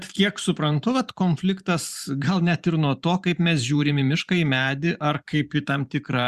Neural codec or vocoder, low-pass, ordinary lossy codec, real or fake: vocoder, 44.1 kHz, 128 mel bands every 512 samples, BigVGAN v2; 14.4 kHz; Opus, 64 kbps; fake